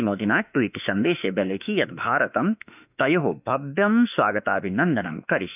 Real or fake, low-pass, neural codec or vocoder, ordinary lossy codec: fake; 3.6 kHz; autoencoder, 48 kHz, 32 numbers a frame, DAC-VAE, trained on Japanese speech; none